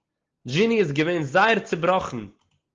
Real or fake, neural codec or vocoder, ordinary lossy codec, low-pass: real; none; Opus, 16 kbps; 7.2 kHz